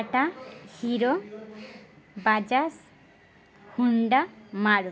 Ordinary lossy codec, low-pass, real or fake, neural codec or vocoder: none; none; real; none